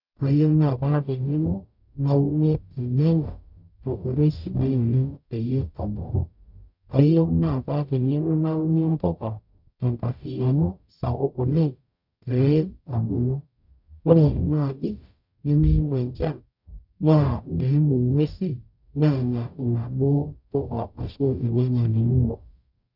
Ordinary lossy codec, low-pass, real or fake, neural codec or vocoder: none; 5.4 kHz; fake; codec, 44.1 kHz, 0.9 kbps, DAC